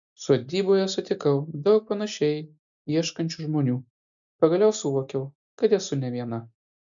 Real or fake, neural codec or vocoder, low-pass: real; none; 7.2 kHz